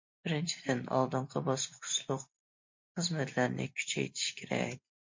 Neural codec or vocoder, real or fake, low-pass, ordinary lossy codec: none; real; 7.2 kHz; MP3, 48 kbps